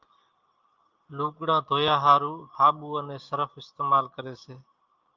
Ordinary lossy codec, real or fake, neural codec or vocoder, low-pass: Opus, 32 kbps; real; none; 7.2 kHz